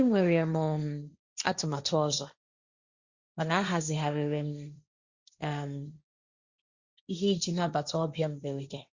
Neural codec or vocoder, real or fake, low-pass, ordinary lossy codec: codec, 16 kHz, 1.1 kbps, Voila-Tokenizer; fake; 7.2 kHz; Opus, 64 kbps